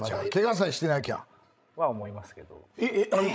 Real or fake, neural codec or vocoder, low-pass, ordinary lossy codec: fake; codec, 16 kHz, 16 kbps, FreqCodec, larger model; none; none